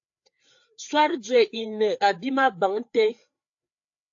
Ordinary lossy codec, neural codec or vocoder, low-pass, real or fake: AAC, 48 kbps; codec, 16 kHz, 4 kbps, FreqCodec, larger model; 7.2 kHz; fake